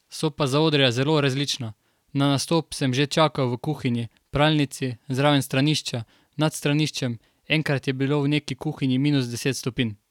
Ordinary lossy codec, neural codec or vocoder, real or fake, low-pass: none; vocoder, 44.1 kHz, 128 mel bands every 256 samples, BigVGAN v2; fake; 19.8 kHz